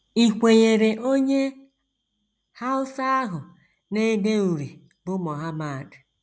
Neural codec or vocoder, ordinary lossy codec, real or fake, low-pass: none; none; real; none